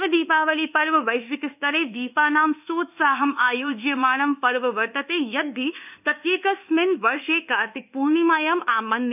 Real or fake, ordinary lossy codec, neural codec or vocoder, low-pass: fake; none; codec, 24 kHz, 1.2 kbps, DualCodec; 3.6 kHz